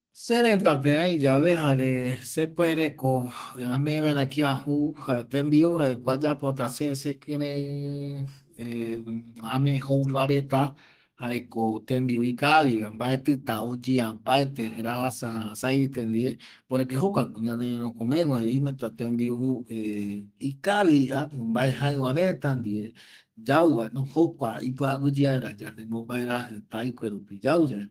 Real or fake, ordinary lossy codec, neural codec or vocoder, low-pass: fake; Opus, 24 kbps; codec, 32 kHz, 1.9 kbps, SNAC; 14.4 kHz